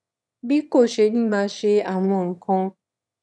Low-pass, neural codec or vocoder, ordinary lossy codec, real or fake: none; autoencoder, 22.05 kHz, a latent of 192 numbers a frame, VITS, trained on one speaker; none; fake